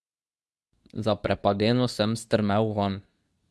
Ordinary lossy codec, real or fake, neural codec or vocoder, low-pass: none; fake; codec, 24 kHz, 0.9 kbps, WavTokenizer, medium speech release version 2; none